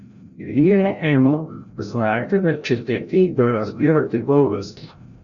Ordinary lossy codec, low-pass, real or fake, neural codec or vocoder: Opus, 64 kbps; 7.2 kHz; fake; codec, 16 kHz, 0.5 kbps, FreqCodec, larger model